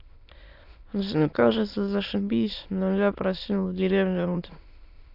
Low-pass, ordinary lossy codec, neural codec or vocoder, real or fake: 5.4 kHz; AAC, 48 kbps; autoencoder, 22.05 kHz, a latent of 192 numbers a frame, VITS, trained on many speakers; fake